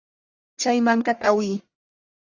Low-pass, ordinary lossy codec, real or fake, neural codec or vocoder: 7.2 kHz; Opus, 64 kbps; fake; codec, 44.1 kHz, 1.7 kbps, Pupu-Codec